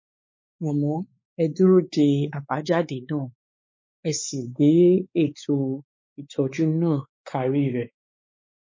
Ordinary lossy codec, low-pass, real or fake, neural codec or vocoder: MP3, 32 kbps; 7.2 kHz; fake; codec, 16 kHz, 4 kbps, X-Codec, WavLM features, trained on Multilingual LibriSpeech